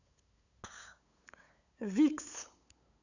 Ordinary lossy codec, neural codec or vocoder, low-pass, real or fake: none; codec, 16 kHz, 8 kbps, FunCodec, trained on LibriTTS, 25 frames a second; 7.2 kHz; fake